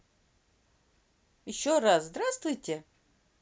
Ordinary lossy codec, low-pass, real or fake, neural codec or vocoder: none; none; real; none